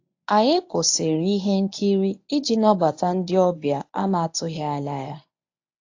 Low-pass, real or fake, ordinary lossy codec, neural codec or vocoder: 7.2 kHz; fake; AAC, 48 kbps; codec, 24 kHz, 0.9 kbps, WavTokenizer, medium speech release version 1